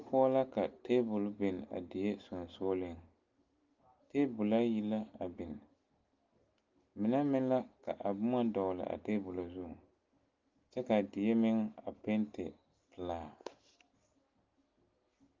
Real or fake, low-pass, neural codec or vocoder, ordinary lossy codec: real; 7.2 kHz; none; Opus, 32 kbps